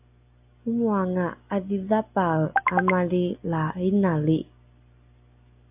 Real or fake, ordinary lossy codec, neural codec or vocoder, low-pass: real; AAC, 32 kbps; none; 3.6 kHz